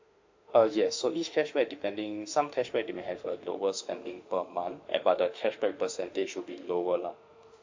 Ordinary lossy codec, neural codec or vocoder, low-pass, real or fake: MP3, 48 kbps; autoencoder, 48 kHz, 32 numbers a frame, DAC-VAE, trained on Japanese speech; 7.2 kHz; fake